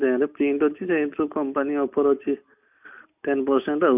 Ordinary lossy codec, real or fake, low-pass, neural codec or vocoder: none; real; 3.6 kHz; none